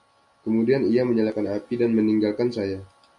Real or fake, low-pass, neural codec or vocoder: real; 10.8 kHz; none